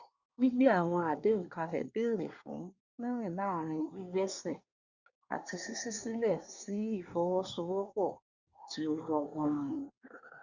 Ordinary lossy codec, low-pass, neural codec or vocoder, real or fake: Opus, 64 kbps; 7.2 kHz; codec, 24 kHz, 1 kbps, SNAC; fake